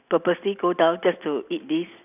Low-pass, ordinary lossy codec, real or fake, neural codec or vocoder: 3.6 kHz; none; real; none